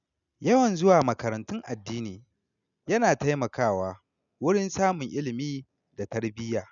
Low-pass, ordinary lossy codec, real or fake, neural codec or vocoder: 7.2 kHz; none; real; none